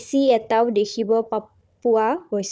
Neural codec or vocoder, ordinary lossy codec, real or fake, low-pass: codec, 16 kHz, 4 kbps, FunCodec, trained on Chinese and English, 50 frames a second; none; fake; none